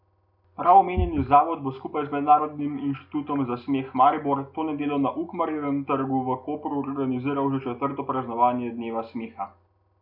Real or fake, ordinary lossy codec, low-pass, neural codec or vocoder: real; none; 5.4 kHz; none